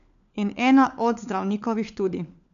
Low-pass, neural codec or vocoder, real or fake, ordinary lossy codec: 7.2 kHz; codec, 16 kHz, 4 kbps, FunCodec, trained on LibriTTS, 50 frames a second; fake; none